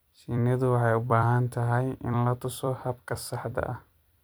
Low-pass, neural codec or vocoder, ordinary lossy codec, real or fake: none; vocoder, 44.1 kHz, 128 mel bands every 256 samples, BigVGAN v2; none; fake